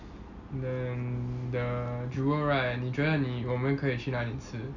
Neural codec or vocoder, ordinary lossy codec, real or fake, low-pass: none; none; real; 7.2 kHz